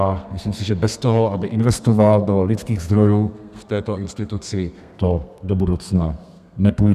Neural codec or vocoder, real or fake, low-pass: codec, 32 kHz, 1.9 kbps, SNAC; fake; 14.4 kHz